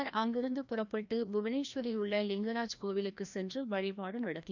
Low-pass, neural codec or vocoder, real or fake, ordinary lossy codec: 7.2 kHz; codec, 16 kHz, 1 kbps, FreqCodec, larger model; fake; none